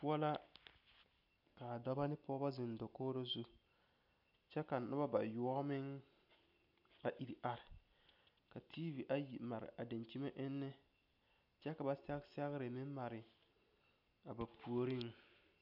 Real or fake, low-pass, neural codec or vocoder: real; 5.4 kHz; none